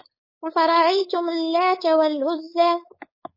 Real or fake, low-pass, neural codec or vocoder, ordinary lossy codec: fake; 5.4 kHz; codec, 16 kHz, 4.8 kbps, FACodec; MP3, 24 kbps